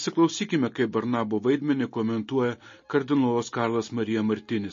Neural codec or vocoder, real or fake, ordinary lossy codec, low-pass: none; real; MP3, 32 kbps; 7.2 kHz